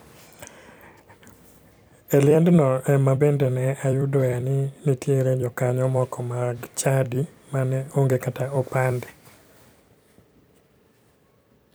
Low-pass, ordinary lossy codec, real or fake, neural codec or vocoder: none; none; fake; vocoder, 44.1 kHz, 128 mel bands every 256 samples, BigVGAN v2